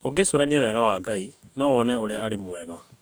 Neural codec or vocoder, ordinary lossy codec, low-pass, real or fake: codec, 44.1 kHz, 2.6 kbps, DAC; none; none; fake